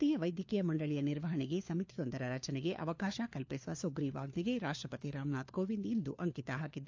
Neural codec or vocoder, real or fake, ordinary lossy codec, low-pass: codec, 16 kHz, 4 kbps, FunCodec, trained on LibriTTS, 50 frames a second; fake; AAC, 48 kbps; 7.2 kHz